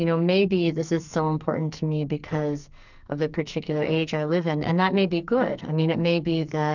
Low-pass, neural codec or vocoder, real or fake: 7.2 kHz; codec, 44.1 kHz, 2.6 kbps, SNAC; fake